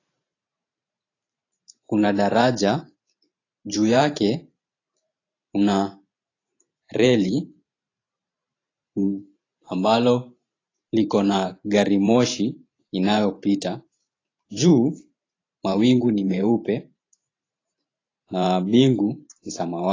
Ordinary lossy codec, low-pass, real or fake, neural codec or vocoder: AAC, 32 kbps; 7.2 kHz; fake; vocoder, 44.1 kHz, 128 mel bands every 512 samples, BigVGAN v2